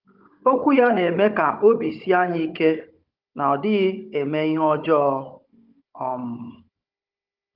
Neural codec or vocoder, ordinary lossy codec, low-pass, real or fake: codec, 16 kHz, 16 kbps, FunCodec, trained on Chinese and English, 50 frames a second; Opus, 32 kbps; 5.4 kHz; fake